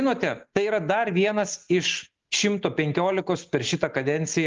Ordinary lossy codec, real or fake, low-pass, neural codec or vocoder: Opus, 32 kbps; real; 7.2 kHz; none